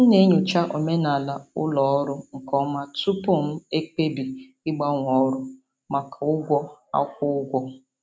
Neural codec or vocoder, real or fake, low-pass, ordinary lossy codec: none; real; none; none